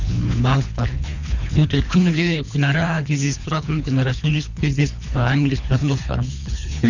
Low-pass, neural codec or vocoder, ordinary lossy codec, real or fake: 7.2 kHz; codec, 24 kHz, 3 kbps, HILCodec; none; fake